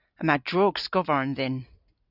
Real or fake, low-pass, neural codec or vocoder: real; 5.4 kHz; none